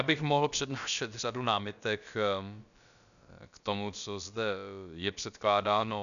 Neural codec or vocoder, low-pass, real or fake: codec, 16 kHz, about 1 kbps, DyCAST, with the encoder's durations; 7.2 kHz; fake